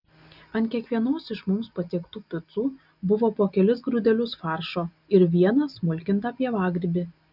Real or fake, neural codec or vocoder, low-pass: real; none; 5.4 kHz